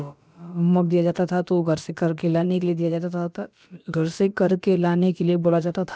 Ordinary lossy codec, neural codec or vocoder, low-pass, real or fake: none; codec, 16 kHz, about 1 kbps, DyCAST, with the encoder's durations; none; fake